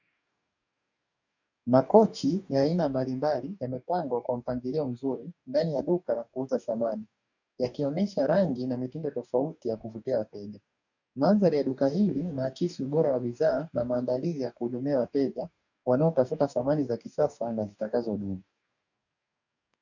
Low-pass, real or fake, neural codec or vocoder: 7.2 kHz; fake; codec, 44.1 kHz, 2.6 kbps, DAC